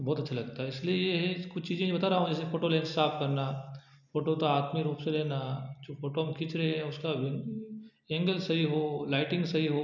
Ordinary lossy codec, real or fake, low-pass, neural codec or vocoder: none; real; 7.2 kHz; none